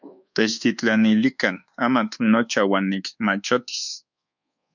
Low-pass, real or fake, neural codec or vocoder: 7.2 kHz; fake; codec, 24 kHz, 1.2 kbps, DualCodec